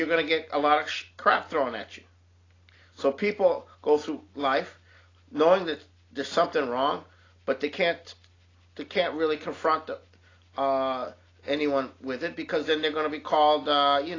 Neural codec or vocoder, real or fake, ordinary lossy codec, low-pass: none; real; AAC, 32 kbps; 7.2 kHz